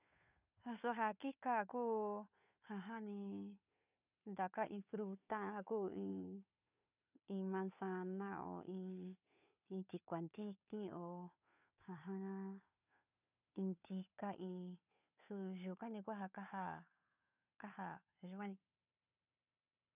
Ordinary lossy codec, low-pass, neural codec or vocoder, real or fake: none; 3.6 kHz; codec, 16 kHz, 2 kbps, FunCodec, trained on Chinese and English, 25 frames a second; fake